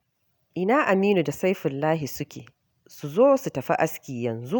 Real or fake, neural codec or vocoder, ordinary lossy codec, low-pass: real; none; none; none